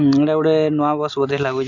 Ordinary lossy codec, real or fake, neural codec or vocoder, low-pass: none; real; none; 7.2 kHz